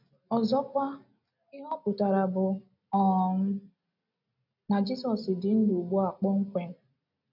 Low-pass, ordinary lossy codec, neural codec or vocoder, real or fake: 5.4 kHz; none; none; real